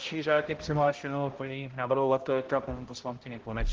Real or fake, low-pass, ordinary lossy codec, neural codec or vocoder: fake; 7.2 kHz; Opus, 16 kbps; codec, 16 kHz, 0.5 kbps, X-Codec, HuBERT features, trained on balanced general audio